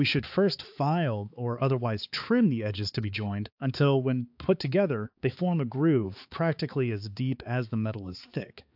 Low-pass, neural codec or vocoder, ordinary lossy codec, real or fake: 5.4 kHz; codec, 16 kHz, 4 kbps, X-Codec, HuBERT features, trained on balanced general audio; AAC, 48 kbps; fake